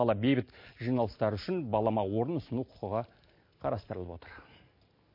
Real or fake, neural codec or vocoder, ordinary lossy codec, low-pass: real; none; MP3, 32 kbps; 5.4 kHz